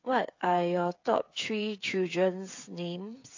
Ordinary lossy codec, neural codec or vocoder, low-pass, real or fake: AAC, 48 kbps; codec, 16 kHz, 8 kbps, FreqCodec, smaller model; 7.2 kHz; fake